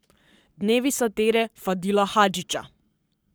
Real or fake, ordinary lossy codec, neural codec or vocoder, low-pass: fake; none; codec, 44.1 kHz, 7.8 kbps, Pupu-Codec; none